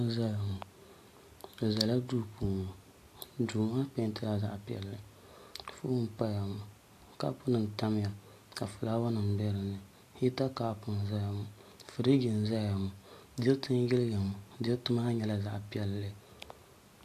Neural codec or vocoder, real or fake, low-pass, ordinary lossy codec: none; real; 14.4 kHz; AAC, 64 kbps